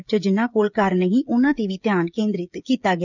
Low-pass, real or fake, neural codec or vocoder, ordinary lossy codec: 7.2 kHz; fake; codec, 16 kHz, 16 kbps, FreqCodec, smaller model; none